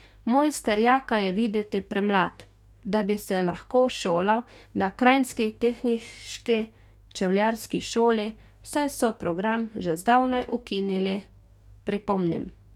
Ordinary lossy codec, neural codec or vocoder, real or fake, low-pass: none; codec, 44.1 kHz, 2.6 kbps, DAC; fake; 19.8 kHz